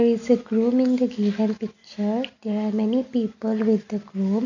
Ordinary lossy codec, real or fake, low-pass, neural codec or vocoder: none; real; 7.2 kHz; none